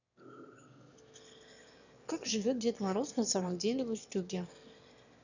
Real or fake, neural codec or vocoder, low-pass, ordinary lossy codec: fake; autoencoder, 22.05 kHz, a latent of 192 numbers a frame, VITS, trained on one speaker; 7.2 kHz; none